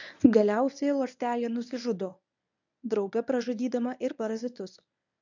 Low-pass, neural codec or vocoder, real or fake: 7.2 kHz; codec, 24 kHz, 0.9 kbps, WavTokenizer, medium speech release version 1; fake